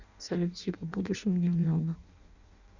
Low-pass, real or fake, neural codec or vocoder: 7.2 kHz; fake; codec, 16 kHz in and 24 kHz out, 0.6 kbps, FireRedTTS-2 codec